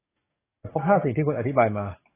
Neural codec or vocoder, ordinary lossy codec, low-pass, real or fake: codec, 44.1 kHz, 7.8 kbps, DAC; AAC, 16 kbps; 3.6 kHz; fake